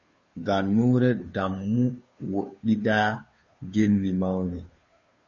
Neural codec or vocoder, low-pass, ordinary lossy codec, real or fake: codec, 16 kHz, 2 kbps, FunCodec, trained on Chinese and English, 25 frames a second; 7.2 kHz; MP3, 32 kbps; fake